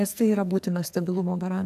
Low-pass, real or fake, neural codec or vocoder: 14.4 kHz; fake; codec, 44.1 kHz, 2.6 kbps, SNAC